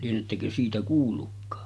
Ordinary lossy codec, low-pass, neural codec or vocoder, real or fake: none; none; none; real